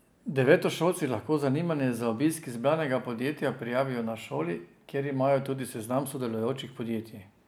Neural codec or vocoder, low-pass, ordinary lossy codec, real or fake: none; none; none; real